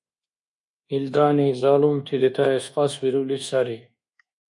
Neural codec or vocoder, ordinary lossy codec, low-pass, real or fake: codec, 24 kHz, 1.2 kbps, DualCodec; MP3, 64 kbps; 10.8 kHz; fake